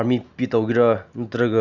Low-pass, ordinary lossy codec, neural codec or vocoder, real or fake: 7.2 kHz; none; none; real